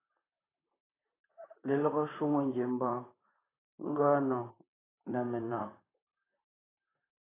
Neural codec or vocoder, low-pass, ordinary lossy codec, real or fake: vocoder, 44.1 kHz, 128 mel bands, Pupu-Vocoder; 3.6 kHz; AAC, 16 kbps; fake